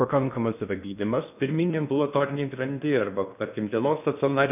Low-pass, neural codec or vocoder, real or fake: 3.6 kHz; codec, 16 kHz in and 24 kHz out, 0.6 kbps, FocalCodec, streaming, 2048 codes; fake